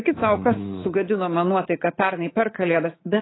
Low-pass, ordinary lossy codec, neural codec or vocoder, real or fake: 7.2 kHz; AAC, 16 kbps; codec, 24 kHz, 3.1 kbps, DualCodec; fake